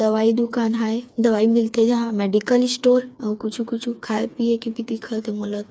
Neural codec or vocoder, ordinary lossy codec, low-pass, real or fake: codec, 16 kHz, 4 kbps, FreqCodec, smaller model; none; none; fake